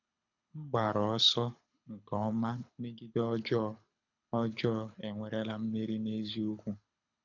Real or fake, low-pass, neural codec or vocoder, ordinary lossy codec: fake; 7.2 kHz; codec, 24 kHz, 6 kbps, HILCodec; none